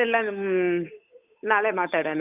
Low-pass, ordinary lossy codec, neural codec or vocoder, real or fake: 3.6 kHz; none; codec, 16 kHz, 8 kbps, FunCodec, trained on Chinese and English, 25 frames a second; fake